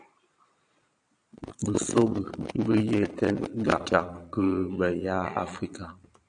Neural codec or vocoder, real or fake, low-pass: vocoder, 22.05 kHz, 80 mel bands, Vocos; fake; 9.9 kHz